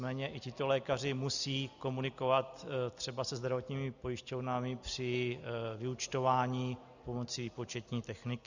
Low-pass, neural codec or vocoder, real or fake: 7.2 kHz; none; real